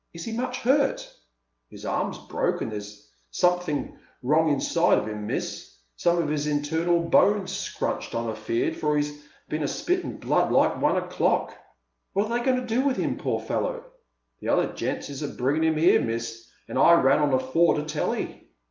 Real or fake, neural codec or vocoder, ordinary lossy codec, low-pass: real; none; Opus, 24 kbps; 7.2 kHz